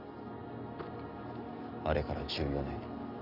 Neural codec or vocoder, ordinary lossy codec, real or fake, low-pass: none; none; real; 5.4 kHz